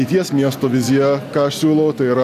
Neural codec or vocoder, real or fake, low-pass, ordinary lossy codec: none; real; 14.4 kHz; AAC, 64 kbps